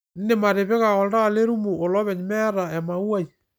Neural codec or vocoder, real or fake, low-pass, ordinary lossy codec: none; real; none; none